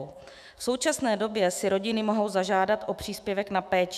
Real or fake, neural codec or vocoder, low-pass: fake; autoencoder, 48 kHz, 128 numbers a frame, DAC-VAE, trained on Japanese speech; 14.4 kHz